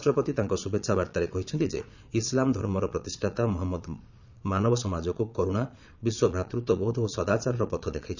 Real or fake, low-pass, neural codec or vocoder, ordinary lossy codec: fake; 7.2 kHz; vocoder, 22.05 kHz, 80 mel bands, Vocos; none